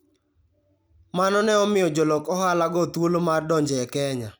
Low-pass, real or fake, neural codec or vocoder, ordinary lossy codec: none; real; none; none